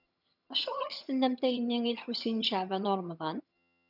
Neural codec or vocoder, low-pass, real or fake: vocoder, 22.05 kHz, 80 mel bands, HiFi-GAN; 5.4 kHz; fake